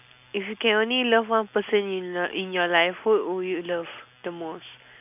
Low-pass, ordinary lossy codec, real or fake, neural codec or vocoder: 3.6 kHz; AAC, 32 kbps; real; none